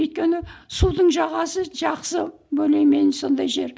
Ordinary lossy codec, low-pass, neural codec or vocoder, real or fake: none; none; none; real